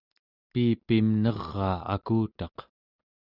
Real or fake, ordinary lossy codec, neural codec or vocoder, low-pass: real; Opus, 64 kbps; none; 5.4 kHz